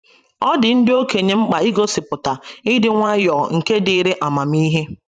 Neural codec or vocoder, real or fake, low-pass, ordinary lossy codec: vocoder, 44.1 kHz, 128 mel bands every 512 samples, BigVGAN v2; fake; 9.9 kHz; none